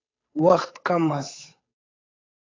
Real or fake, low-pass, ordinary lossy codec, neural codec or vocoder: fake; 7.2 kHz; AAC, 32 kbps; codec, 16 kHz, 8 kbps, FunCodec, trained on Chinese and English, 25 frames a second